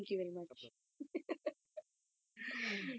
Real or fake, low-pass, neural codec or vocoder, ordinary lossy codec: real; none; none; none